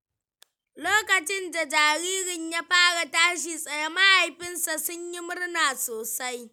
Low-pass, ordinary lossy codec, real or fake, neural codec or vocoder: none; none; real; none